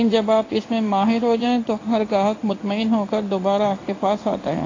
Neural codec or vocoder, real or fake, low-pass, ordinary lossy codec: codec, 16 kHz in and 24 kHz out, 1 kbps, XY-Tokenizer; fake; 7.2 kHz; AAC, 32 kbps